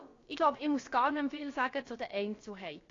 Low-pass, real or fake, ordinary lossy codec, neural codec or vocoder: 7.2 kHz; fake; AAC, 32 kbps; codec, 16 kHz, about 1 kbps, DyCAST, with the encoder's durations